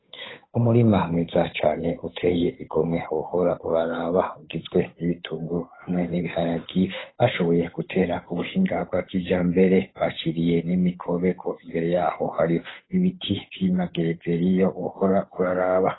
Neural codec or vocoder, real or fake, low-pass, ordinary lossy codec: codec, 16 kHz, 8 kbps, FunCodec, trained on Chinese and English, 25 frames a second; fake; 7.2 kHz; AAC, 16 kbps